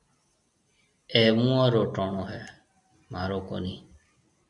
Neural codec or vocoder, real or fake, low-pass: none; real; 10.8 kHz